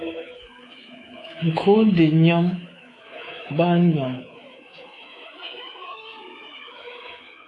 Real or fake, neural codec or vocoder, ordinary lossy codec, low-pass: fake; codec, 24 kHz, 3.1 kbps, DualCodec; AAC, 48 kbps; 10.8 kHz